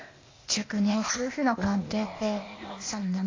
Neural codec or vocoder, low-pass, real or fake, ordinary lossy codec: codec, 16 kHz, 0.8 kbps, ZipCodec; 7.2 kHz; fake; AAC, 32 kbps